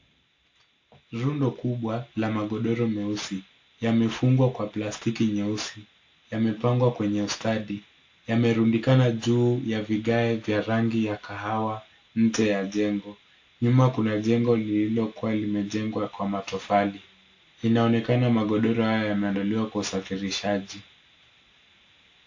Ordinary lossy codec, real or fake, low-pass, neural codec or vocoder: AAC, 48 kbps; real; 7.2 kHz; none